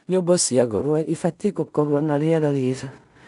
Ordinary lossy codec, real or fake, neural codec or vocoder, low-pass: none; fake; codec, 16 kHz in and 24 kHz out, 0.4 kbps, LongCat-Audio-Codec, two codebook decoder; 10.8 kHz